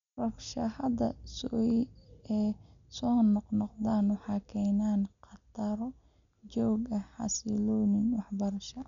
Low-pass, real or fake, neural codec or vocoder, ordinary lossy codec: 7.2 kHz; real; none; none